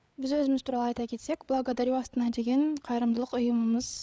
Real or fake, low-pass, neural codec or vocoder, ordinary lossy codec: fake; none; codec, 16 kHz, 8 kbps, FreqCodec, larger model; none